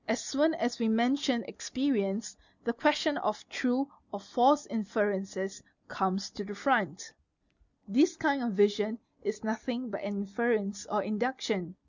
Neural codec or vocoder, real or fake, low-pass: none; real; 7.2 kHz